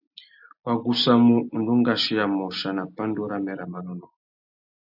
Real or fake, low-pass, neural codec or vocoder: real; 5.4 kHz; none